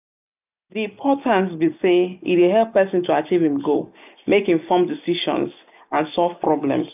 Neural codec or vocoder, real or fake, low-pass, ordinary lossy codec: none; real; 3.6 kHz; none